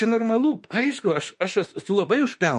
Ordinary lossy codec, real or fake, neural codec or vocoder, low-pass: MP3, 48 kbps; fake; autoencoder, 48 kHz, 32 numbers a frame, DAC-VAE, trained on Japanese speech; 14.4 kHz